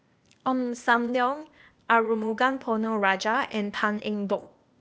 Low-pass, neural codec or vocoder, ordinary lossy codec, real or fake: none; codec, 16 kHz, 0.8 kbps, ZipCodec; none; fake